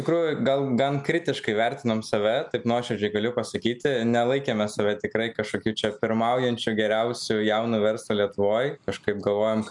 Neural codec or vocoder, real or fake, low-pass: none; real; 10.8 kHz